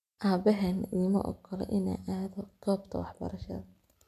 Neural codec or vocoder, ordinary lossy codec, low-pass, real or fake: none; none; 14.4 kHz; real